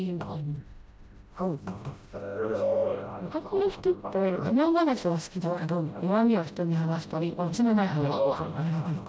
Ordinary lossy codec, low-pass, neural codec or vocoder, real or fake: none; none; codec, 16 kHz, 0.5 kbps, FreqCodec, smaller model; fake